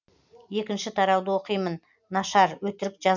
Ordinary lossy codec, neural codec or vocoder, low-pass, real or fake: none; none; 7.2 kHz; real